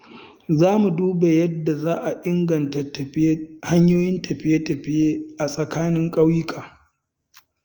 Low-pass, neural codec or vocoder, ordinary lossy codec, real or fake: 19.8 kHz; autoencoder, 48 kHz, 128 numbers a frame, DAC-VAE, trained on Japanese speech; Opus, 32 kbps; fake